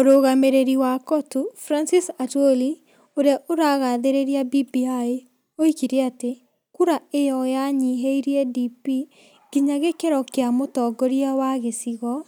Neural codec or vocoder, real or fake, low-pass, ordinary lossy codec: none; real; none; none